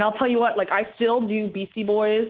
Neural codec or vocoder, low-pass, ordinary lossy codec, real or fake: codec, 24 kHz, 3.1 kbps, DualCodec; 7.2 kHz; Opus, 16 kbps; fake